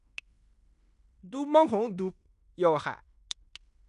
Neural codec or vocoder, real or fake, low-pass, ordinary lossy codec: codec, 16 kHz in and 24 kHz out, 0.9 kbps, LongCat-Audio-Codec, fine tuned four codebook decoder; fake; 10.8 kHz; none